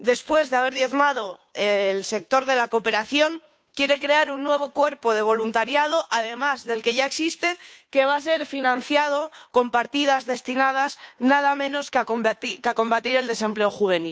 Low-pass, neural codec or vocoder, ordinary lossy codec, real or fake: none; codec, 16 kHz, 2 kbps, FunCodec, trained on Chinese and English, 25 frames a second; none; fake